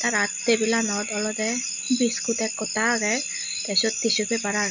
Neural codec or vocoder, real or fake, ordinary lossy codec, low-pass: none; real; none; 7.2 kHz